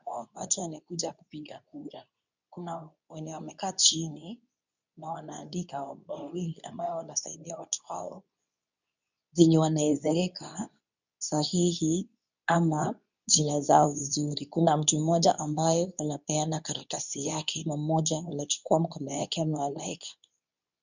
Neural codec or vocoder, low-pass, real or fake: codec, 24 kHz, 0.9 kbps, WavTokenizer, medium speech release version 2; 7.2 kHz; fake